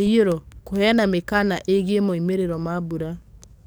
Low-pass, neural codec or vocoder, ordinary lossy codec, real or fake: none; codec, 44.1 kHz, 7.8 kbps, DAC; none; fake